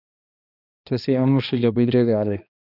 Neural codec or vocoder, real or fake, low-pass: codec, 16 kHz, 1 kbps, X-Codec, HuBERT features, trained on balanced general audio; fake; 5.4 kHz